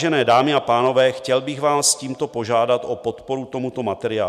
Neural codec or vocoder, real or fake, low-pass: none; real; 14.4 kHz